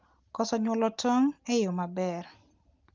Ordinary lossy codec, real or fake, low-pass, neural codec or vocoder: Opus, 32 kbps; real; 7.2 kHz; none